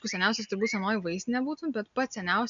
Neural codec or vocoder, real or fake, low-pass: none; real; 7.2 kHz